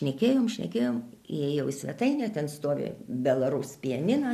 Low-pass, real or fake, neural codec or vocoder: 14.4 kHz; real; none